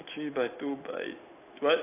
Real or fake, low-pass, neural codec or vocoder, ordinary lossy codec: real; 3.6 kHz; none; AAC, 24 kbps